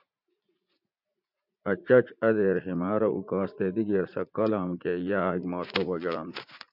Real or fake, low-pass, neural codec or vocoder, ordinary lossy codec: fake; 5.4 kHz; vocoder, 44.1 kHz, 80 mel bands, Vocos; MP3, 48 kbps